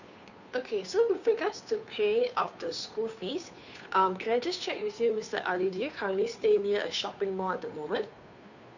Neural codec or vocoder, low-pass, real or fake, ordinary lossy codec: codec, 16 kHz, 2 kbps, FunCodec, trained on Chinese and English, 25 frames a second; 7.2 kHz; fake; none